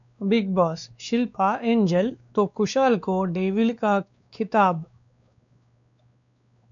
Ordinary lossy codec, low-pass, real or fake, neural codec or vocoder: MP3, 96 kbps; 7.2 kHz; fake; codec, 16 kHz, 2 kbps, X-Codec, WavLM features, trained on Multilingual LibriSpeech